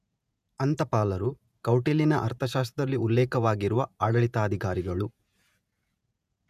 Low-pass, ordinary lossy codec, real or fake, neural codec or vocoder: 14.4 kHz; none; fake; vocoder, 48 kHz, 128 mel bands, Vocos